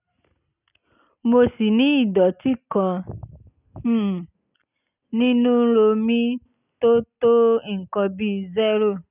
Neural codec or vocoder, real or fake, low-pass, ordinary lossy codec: none; real; 3.6 kHz; none